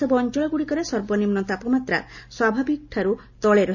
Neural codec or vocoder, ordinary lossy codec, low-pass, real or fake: none; none; 7.2 kHz; real